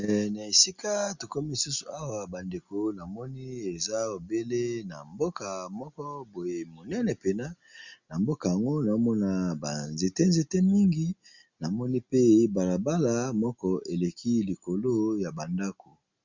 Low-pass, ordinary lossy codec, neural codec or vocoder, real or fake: 7.2 kHz; Opus, 64 kbps; none; real